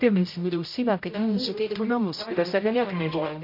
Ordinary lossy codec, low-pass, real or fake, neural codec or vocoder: MP3, 32 kbps; 5.4 kHz; fake; codec, 16 kHz, 0.5 kbps, X-Codec, HuBERT features, trained on general audio